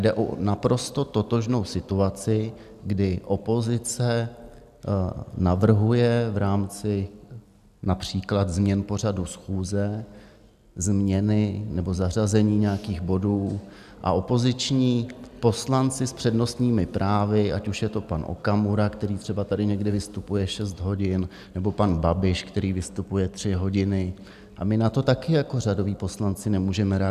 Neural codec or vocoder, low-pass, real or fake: none; 14.4 kHz; real